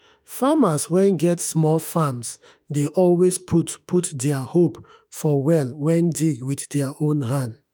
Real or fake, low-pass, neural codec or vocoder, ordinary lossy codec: fake; none; autoencoder, 48 kHz, 32 numbers a frame, DAC-VAE, trained on Japanese speech; none